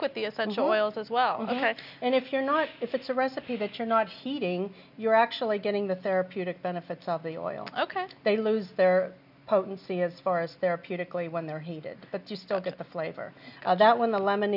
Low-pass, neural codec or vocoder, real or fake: 5.4 kHz; none; real